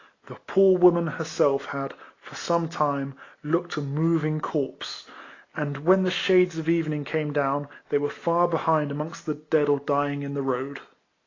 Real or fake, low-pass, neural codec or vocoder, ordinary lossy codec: real; 7.2 kHz; none; AAC, 32 kbps